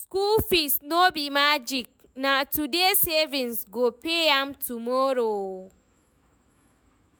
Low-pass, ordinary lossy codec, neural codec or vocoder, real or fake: none; none; autoencoder, 48 kHz, 128 numbers a frame, DAC-VAE, trained on Japanese speech; fake